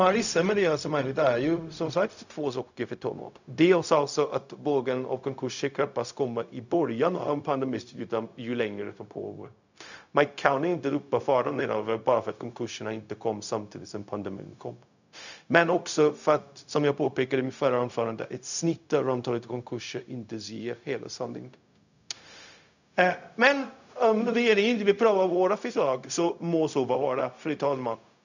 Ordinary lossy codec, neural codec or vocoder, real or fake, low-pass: none; codec, 16 kHz, 0.4 kbps, LongCat-Audio-Codec; fake; 7.2 kHz